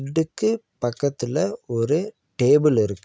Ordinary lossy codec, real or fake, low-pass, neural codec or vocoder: none; real; none; none